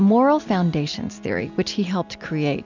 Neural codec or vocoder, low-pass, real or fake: none; 7.2 kHz; real